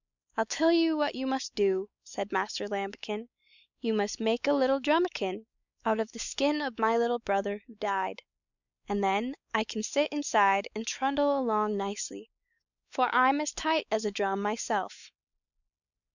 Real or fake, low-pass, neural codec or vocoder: fake; 7.2 kHz; codec, 16 kHz, 4 kbps, X-Codec, WavLM features, trained on Multilingual LibriSpeech